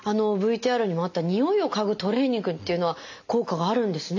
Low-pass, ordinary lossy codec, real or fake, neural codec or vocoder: 7.2 kHz; AAC, 48 kbps; real; none